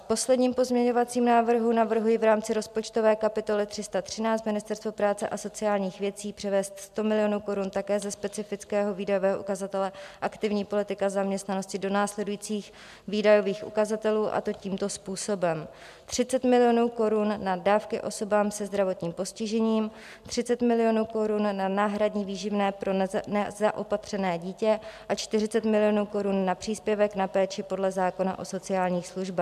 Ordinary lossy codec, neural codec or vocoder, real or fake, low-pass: MP3, 96 kbps; none; real; 14.4 kHz